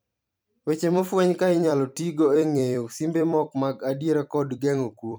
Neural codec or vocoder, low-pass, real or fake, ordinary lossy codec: vocoder, 44.1 kHz, 128 mel bands every 256 samples, BigVGAN v2; none; fake; none